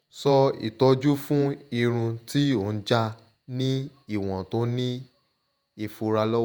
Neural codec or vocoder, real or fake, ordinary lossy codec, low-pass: vocoder, 48 kHz, 128 mel bands, Vocos; fake; none; none